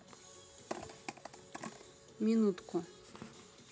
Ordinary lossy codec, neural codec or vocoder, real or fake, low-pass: none; none; real; none